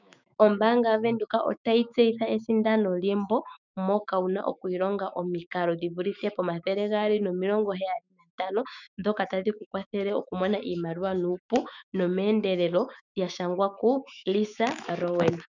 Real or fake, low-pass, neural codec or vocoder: fake; 7.2 kHz; autoencoder, 48 kHz, 128 numbers a frame, DAC-VAE, trained on Japanese speech